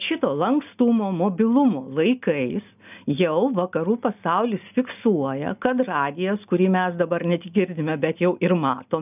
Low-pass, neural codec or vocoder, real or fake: 3.6 kHz; none; real